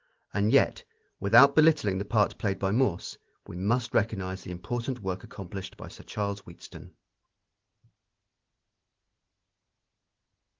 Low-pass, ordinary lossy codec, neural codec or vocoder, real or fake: 7.2 kHz; Opus, 16 kbps; none; real